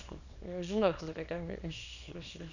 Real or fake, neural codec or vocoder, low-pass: fake; codec, 24 kHz, 0.9 kbps, WavTokenizer, small release; 7.2 kHz